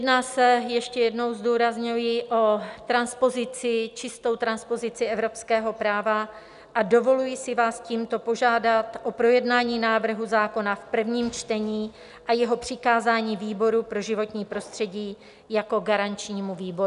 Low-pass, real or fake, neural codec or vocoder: 10.8 kHz; real; none